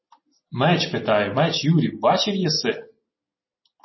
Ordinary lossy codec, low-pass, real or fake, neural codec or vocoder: MP3, 24 kbps; 7.2 kHz; real; none